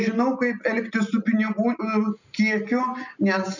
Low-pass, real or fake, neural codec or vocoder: 7.2 kHz; real; none